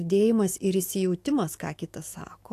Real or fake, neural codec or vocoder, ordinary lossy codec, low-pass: fake; autoencoder, 48 kHz, 128 numbers a frame, DAC-VAE, trained on Japanese speech; AAC, 64 kbps; 14.4 kHz